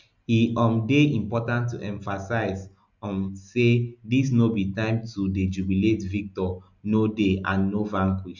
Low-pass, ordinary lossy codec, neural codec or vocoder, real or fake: 7.2 kHz; none; none; real